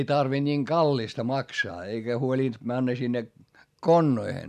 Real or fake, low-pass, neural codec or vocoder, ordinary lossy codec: real; 14.4 kHz; none; none